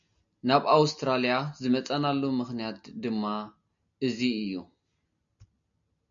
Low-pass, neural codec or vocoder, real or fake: 7.2 kHz; none; real